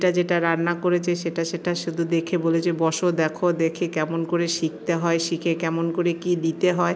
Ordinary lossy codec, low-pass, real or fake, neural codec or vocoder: none; none; real; none